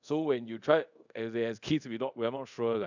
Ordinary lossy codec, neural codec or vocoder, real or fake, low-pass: none; codec, 24 kHz, 0.5 kbps, DualCodec; fake; 7.2 kHz